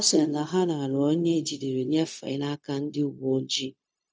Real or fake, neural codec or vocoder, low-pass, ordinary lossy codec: fake; codec, 16 kHz, 0.4 kbps, LongCat-Audio-Codec; none; none